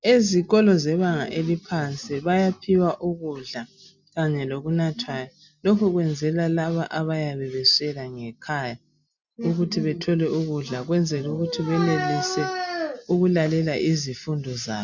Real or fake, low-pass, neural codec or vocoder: real; 7.2 kHz; none